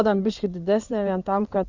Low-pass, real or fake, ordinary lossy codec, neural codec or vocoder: 7.2 kHz; fake; Opus, 64 kbps; codec, 16 kHz in and 24 kHz out, 2.2 kbps, FireRedTTS-2 codec